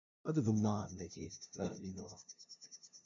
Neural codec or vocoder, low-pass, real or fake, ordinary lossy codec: codec, 16 kHz, 0.5 kbps, FunCodec, trained on LibriTTS, 25 frames a second; 7.2 kHz; fake; AAC, 64 kbps